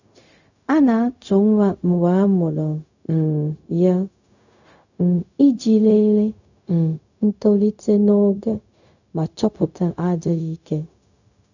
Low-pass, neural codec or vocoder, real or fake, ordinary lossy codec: 7.2 kHz; codec, 16 kHz, 0.4 kbps, LongCat-Audio-Codec; fake; none